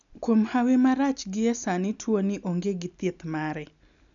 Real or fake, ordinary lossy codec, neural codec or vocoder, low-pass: real; none; none; 7.2 kHz